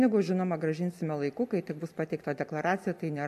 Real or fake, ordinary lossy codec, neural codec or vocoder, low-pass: real; MP3, 64 kbps; none; 14.4 kHz